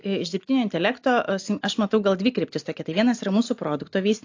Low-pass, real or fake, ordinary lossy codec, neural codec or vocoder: 7.2 kHz; real; AAC, 48 kbps; none